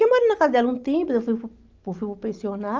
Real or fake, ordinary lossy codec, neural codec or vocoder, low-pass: real; Opus, 24 kbps; none; 7.2 kHz